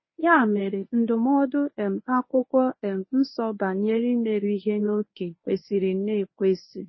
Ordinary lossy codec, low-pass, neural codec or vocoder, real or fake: MP3, 24 kbps; 7.2 kHz; codec, 24 kHz, 0.9 kbps, WavTokenizer, medium speech release version 1; fake